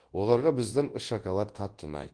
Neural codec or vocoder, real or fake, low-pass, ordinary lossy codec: codec, 24 kHz, 0.9 kbps, WavTokenizer, large speech release; fake; 9.9 kHz; Opus, 16 kbps